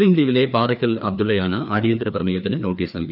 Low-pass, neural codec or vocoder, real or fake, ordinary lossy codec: 5.4 kHz; codec, 16 kHz, 2 kbps, FreqCodec, larger model; fake; none